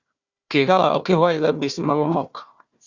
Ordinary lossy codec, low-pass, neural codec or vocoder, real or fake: Opus, 64 kbps; 7.2 kHz; codec, 16 kHz, 1 kbps, FunCodec, trained on Chinese and English, 50 frames a second; fake